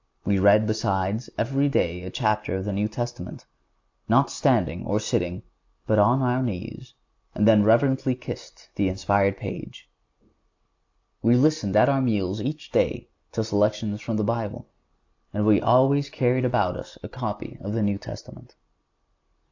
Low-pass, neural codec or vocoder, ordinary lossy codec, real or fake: 7.2 kHz; none; AAC, 48 kbps; real